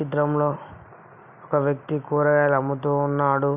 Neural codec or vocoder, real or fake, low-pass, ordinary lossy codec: none; real; 3.6 kHz; none